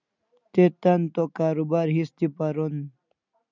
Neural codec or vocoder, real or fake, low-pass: none; real; 7.2 kHz